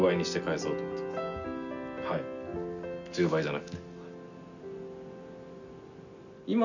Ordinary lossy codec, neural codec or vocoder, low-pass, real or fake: none; none; 7.2 kHz; real